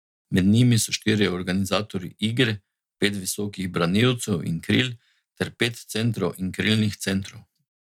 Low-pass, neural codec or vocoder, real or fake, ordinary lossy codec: 19.8 kHz; none; real; none